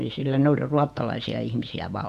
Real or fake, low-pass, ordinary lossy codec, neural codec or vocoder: fake; 14.4 kHz; none; vocoder, 48 kHz, 128 mel bands, Vocos